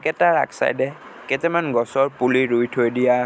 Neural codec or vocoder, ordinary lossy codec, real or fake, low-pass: none; none; real; none